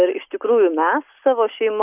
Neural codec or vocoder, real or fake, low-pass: none; real; 3.6 kHz